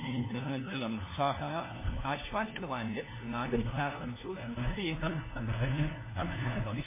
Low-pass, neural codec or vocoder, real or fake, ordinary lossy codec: 3.6 kHz; codec, 16 kHz, 1 kbps, FunCodec, trained on LibriTTS, 50 frames a second; fake; MP3, 16 kbps